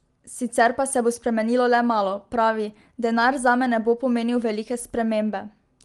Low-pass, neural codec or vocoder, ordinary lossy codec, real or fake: 10.8 kHz; none; Opus, 24 kbps; real